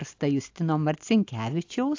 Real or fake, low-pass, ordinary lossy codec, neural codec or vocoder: real; 7.2 kHz; AAC, 48 kbps; none